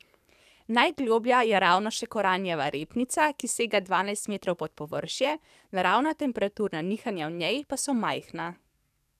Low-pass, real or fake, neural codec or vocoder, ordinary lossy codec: 14.4 kHz; fake; codec, 44.1 kHz, 7.8 kbps, DAC; none